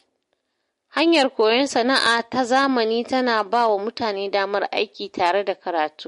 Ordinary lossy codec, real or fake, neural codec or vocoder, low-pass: MP3, 48 kbps; real; none; 14.4 kHz